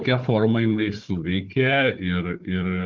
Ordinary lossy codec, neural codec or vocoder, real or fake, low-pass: Opus, 32 kbps; codec, 16 kHz, 4 kbps, FunCodec, trained on Chinese and English, 50 frames a second; fake; 7.2 kHz